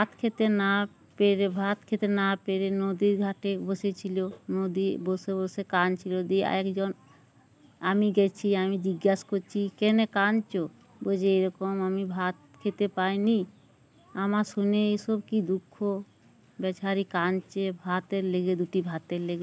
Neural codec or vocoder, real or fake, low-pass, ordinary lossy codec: none; real; none; none